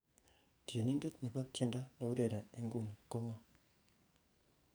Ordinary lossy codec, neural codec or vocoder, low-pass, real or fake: none; codec, 44.1 kHz, 2.6 kbps, SNAC; none; fake